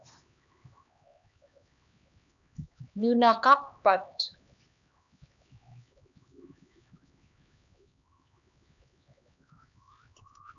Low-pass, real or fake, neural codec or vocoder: 7.2 kHz; fake; codec, 16 kHz, 2 kbps, X-Codec, HuBERT features, trained on LibriSpeech